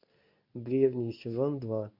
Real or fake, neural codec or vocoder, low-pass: fake; codec, 24 kHz, 0.9 kbps, WavTokenizer, medium speech release version 2; 5.4 kHz